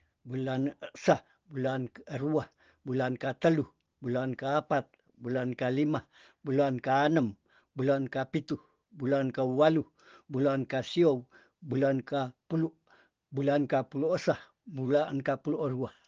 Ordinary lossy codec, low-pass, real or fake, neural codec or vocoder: Opus, 16 kbps; 7.2 kHz; real; none